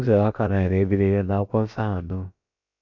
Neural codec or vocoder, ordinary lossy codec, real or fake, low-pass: codec, 16 kHz, about 1 kbps, DyCAST, with the encoder's durations; none; fake; 7.2 kHz